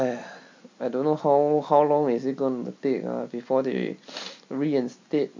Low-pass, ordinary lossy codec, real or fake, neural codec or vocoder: 7.2 kHz; MP3, 64 kbps; real; none